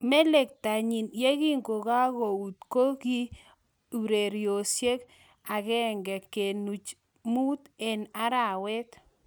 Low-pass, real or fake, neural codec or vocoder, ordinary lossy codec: none; real; none; none